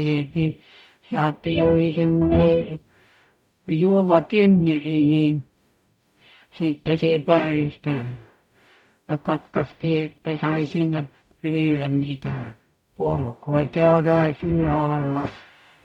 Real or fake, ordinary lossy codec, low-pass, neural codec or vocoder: fake; none; 19.8 kHz; codec, 44.1 kHz, 0.9 kbps, DAC